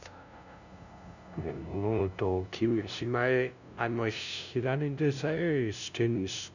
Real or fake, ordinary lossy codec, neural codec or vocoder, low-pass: fake; none; codec, 16 kHz, 0.5 kbps, FunCodec, trained on LibriTTS, 25 frames a second; 7.2 kHz